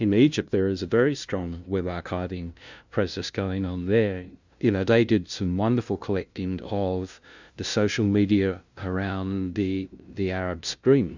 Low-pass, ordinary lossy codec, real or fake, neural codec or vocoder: 7.2 kHz; Opus, 64 kbps; fake; codec, 16 kHz, 0.5 kbps, FunCodec, trained on LibriTTS, 25 frames a second